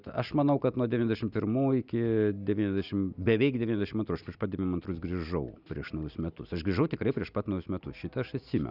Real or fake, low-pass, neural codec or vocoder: real; 5.4 kHz; none